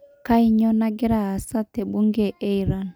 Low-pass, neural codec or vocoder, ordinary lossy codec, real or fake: none; none; none; real